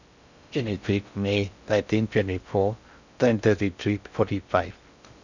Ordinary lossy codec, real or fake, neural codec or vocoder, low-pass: none; fake; codec, 16 kHz in and 24 kHz out, 0.6 kbps, FocalCodec, streaming, 4096 codes; 7.2 kHz